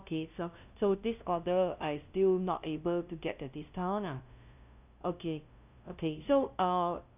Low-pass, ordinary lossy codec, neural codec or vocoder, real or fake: 3.6 kHz; none; codec, 16 kHz, 0.5 kbps, FunCodec, trained on LibriTTS, 25 frames a second; fake